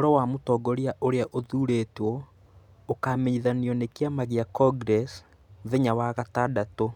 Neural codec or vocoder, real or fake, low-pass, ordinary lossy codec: none; real; 19.8 kHz; none